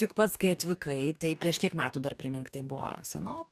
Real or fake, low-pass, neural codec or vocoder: fake; 14.4 kHz; codec, 44.1 kHz, 2.6 kbps, DAC